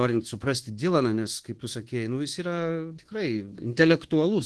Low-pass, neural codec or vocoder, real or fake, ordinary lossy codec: 10.8 kHz; codec, 24 kHz, 1.2 kbps, DualCodec; fake; Opus, 16 kbps